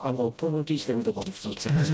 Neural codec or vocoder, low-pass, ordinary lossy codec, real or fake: codec, 16 kHz, 0.5 kbps, FreqCodec, smaller model; none; none; fake